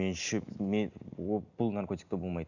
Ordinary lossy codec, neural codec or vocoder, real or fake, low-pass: none; none; real; 7.2 kHz